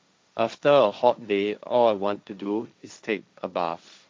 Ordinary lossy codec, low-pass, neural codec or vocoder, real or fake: none; none; codec, 16 kHz, 1.1 kbps, Voila-Tokenizer; fake